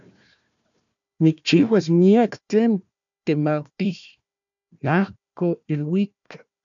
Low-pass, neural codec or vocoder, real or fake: 7.2 kHz; codec, 16 kHz, 1 kbps, FunCodec, trained on Chinese and English, 50 frames a second; fake